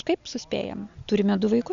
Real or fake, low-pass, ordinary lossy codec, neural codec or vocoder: real; 7.2 kHz; Opus, 64 kbps; none